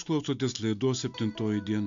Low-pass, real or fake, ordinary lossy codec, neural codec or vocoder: 7.2 kHz; real; MP3, 64 kbps; none